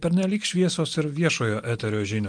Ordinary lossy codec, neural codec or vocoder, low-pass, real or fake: Opus, 32 kbps; none; 9.9 kHz; real